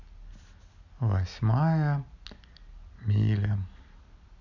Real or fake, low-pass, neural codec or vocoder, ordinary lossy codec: real; 7.2 kHz; none; none